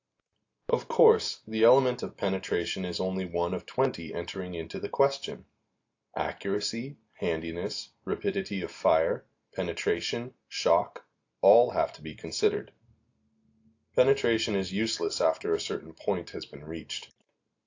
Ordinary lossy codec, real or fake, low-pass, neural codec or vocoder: AAC, 48 kbps; real; 7.2 kHz; none